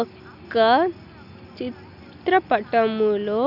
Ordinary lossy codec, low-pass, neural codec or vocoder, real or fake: none; 5.4 kHz; none; real